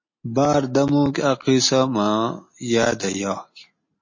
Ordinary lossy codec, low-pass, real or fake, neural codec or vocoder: MP3, 32 kbps; 7.2 kHz; real; none